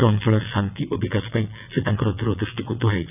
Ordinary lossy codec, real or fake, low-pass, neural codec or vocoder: none; fake; 3.6 kHz; vocoder, 22.05 kHz, 80 mel bands, WaveNeXt